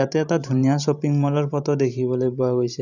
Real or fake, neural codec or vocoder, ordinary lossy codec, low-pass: fake; vocoder, 44.1 kHz, 128 mel bands every 512 samples, BigVGAN v2; none; 7.2 kHz